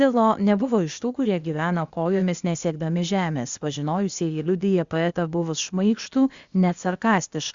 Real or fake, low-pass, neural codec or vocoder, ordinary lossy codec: fake; 7.2 kHz; codec, 16 kHz, 0.8 kbps, ZipCodec; Opus, 64 kbps